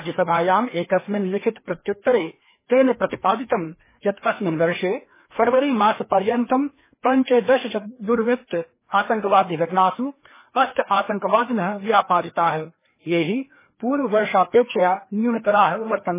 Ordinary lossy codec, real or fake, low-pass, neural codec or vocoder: MP3, 16 kbps; fake; 3.6 kHz; codec, 16 kHz, 2 kbps, FreqCodec, larger model